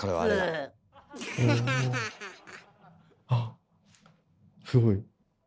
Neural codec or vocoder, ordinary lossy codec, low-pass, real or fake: none; none; none; real